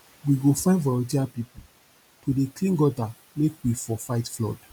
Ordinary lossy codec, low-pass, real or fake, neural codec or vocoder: none; none; real; none